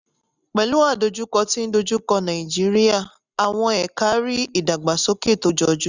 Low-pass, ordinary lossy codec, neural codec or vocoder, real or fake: 7.2 kHz; none; none; real